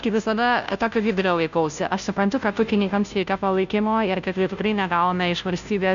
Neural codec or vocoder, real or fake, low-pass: codec, 16 kHz, 0.5 kbps, FunCodec, trained on Chinese and English, 25 frames a second; fake; 7.2 kHz